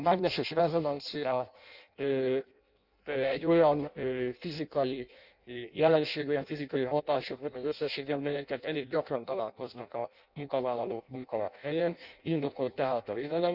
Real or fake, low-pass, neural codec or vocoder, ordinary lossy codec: fake; 5.4 kHz; codec, 16 kHz in and 24 kHz out, 0.6 kbps, FireRedTTS-2 codec; none